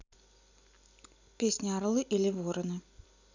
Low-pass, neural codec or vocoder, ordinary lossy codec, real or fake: 7.2 kHz; none; none; real